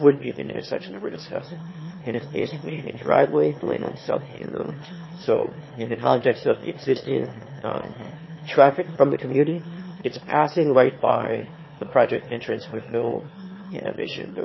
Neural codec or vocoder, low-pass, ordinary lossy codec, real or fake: autoencoder, 22.05 kHz, a latent of 192 numbers a frame, VITS, trained on one speaker; 7.2 kHz; MP3, 24 kbps; fake